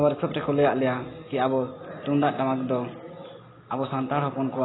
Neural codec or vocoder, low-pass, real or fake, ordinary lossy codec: none; 7.2 kHz; real; AAC, 16 kbps